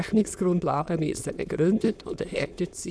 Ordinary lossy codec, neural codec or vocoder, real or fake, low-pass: none; autoencoder, 22.05 kHz, a latent of 192 numbers a frame, VITS, trained on many speakers; fake; none